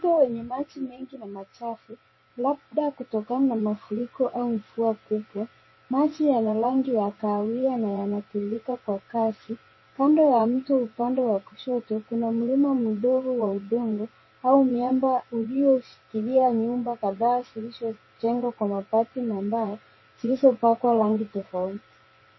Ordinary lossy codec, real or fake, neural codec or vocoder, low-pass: MP3, 24 kbps; fake; vocoder, 24 kHz, 100 mel bands, Vocos; 7.2 kHz